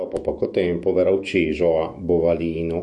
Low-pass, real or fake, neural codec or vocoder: 10.8 kHz; fake; autoencoder, 48 kHz, 128 numbers a frame, DAC-VAE, trained on Japanese speech